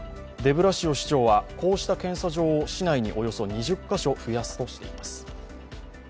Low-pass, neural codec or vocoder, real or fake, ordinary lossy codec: none; none; real; none